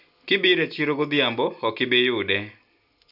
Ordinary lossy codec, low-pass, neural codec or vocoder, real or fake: none; 5.4 kHz; none; real